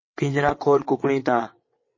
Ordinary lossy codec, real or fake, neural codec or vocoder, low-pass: MP3, 32 kbps; fake; vocoder, 22.05 kHz, 80 mel bands, WaveNeXt; 7.2 kHz